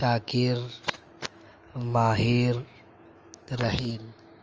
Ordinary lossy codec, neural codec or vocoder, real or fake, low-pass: Opus, 24 kbps; autoencoder, 48 kHz, 128 numbers a frame, DAC-VAE, trained on Japanese speech; fake; 7.2 kHz